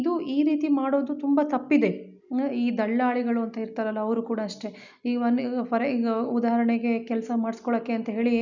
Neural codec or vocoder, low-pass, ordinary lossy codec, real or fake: none; 7.2 kHz; none; real